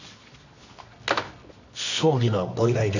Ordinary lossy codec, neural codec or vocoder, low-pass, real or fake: none; codec, 24 kHz, 0.9 kbps, WavTokenizer, medium music audio release; 7.2 kHz; fake